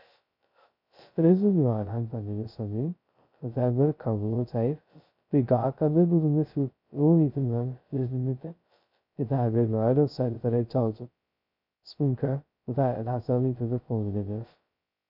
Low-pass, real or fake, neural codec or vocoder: 5.4 kHz; fake; codec, 16 kHz, 0.2 kbps, FocalCodec